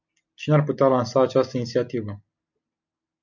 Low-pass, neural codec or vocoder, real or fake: 7.2 kHz; none; real